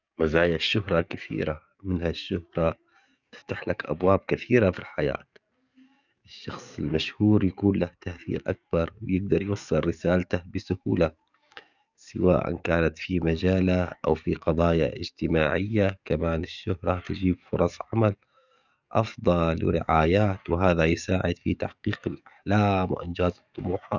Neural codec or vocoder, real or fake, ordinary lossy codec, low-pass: codec, 44.1 kHz, 7.8 kbps, DAC; fake; none; 7.2 kHz